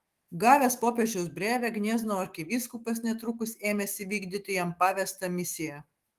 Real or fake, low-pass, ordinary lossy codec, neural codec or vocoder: fake; 14.4 kHz; Opus, 24 kbps; autoencoder, 48 kHz, 128 numbers a frame, DAC-VAE, trained on Japanese speech